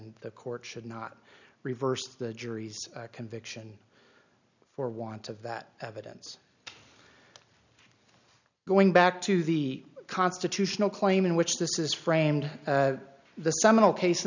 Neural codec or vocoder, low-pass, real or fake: none; 7.2 kHz; real